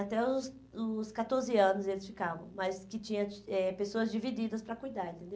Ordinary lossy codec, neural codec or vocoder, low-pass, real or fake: none; none; none; real